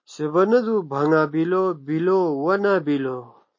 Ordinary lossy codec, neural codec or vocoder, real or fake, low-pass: MP3, 32 kbps; none; real; 7.2 kHz